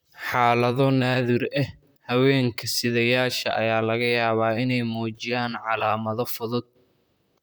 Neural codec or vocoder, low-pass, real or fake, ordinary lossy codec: vocoder, 44.1 kHz, 128 mel bands, Pupu-Vocoder; none; fake; none